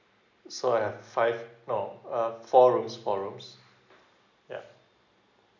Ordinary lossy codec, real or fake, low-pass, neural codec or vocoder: none; real; 7.2 kHz; none